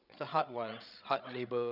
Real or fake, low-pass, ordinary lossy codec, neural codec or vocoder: fake; 5.4 kHz; none; codec, 16 kHz, 16 kbps, FreqCodec, larger model